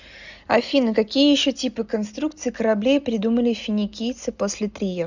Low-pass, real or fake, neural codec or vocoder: 7.2 kHz; real; none